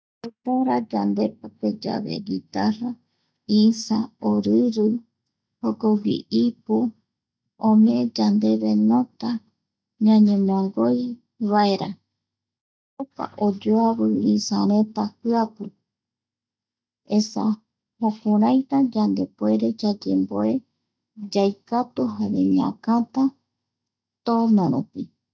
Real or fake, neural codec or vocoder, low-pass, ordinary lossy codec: real; none; none; none